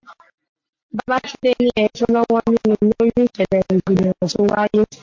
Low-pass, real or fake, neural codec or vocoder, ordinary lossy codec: 7.2 kHz; real; none; MP3, 48 kbps